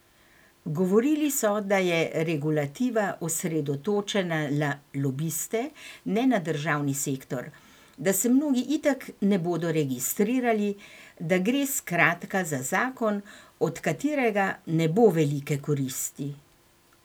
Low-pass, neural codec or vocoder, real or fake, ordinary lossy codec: none; none; real; none